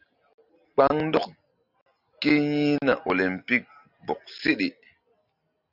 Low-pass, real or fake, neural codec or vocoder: 5.4 kHz; real; none